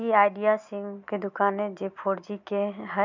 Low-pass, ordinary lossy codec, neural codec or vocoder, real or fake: 7.2 kHz; none; none; real